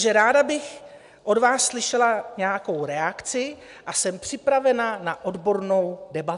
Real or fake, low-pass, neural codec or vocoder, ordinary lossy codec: real; 10.8 kHz; none; AAC, 96 kbps